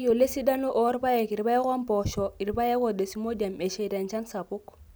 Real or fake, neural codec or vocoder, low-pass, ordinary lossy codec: real; none; none; none